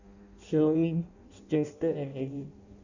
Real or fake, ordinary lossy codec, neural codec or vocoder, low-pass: fake; none; codec, 16 kHz in and 24 kHz out, 0.6 kbps, FireRedTTS-2 codec; 7.2 kHz